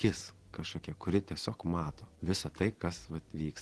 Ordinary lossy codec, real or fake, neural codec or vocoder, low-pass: Opus, 16 kbps; real; none; 10.8 kHz